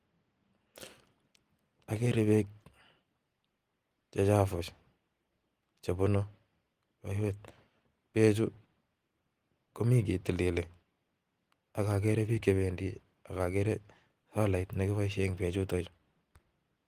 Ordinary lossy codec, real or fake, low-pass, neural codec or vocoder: Opus, 24 kbps; real; 14.4 kHz; none